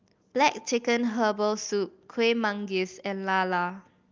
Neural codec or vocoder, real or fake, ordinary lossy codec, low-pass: none; real; Opus, 24 kbps; 7.2 kHz